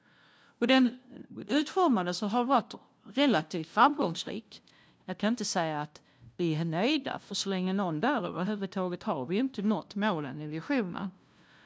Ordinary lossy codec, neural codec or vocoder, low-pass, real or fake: none; codec, 16 kHz, 0.5 kbps, FunCodec, trained on LibriTTS, 25 frames a second; none; fake